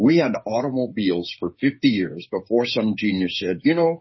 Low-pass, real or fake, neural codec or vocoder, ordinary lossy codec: 7.2 kHz; fake; codec, 16 kHz, 8 kbps, FreqCodec, smaller model; MP3, 24 kbps